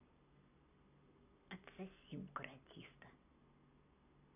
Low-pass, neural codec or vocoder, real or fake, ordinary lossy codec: 3.6 kHz; none; real; none